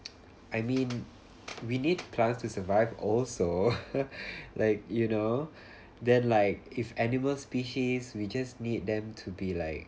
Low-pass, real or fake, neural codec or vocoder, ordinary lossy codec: none; real; none; none